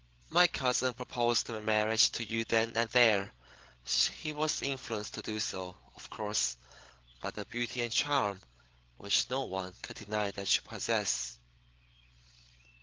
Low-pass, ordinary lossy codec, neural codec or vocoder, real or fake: 7.2 kHz; Opus, 16 kbps; codec, 16 kHz, 8 kbps, FreqCodec, larger model; fake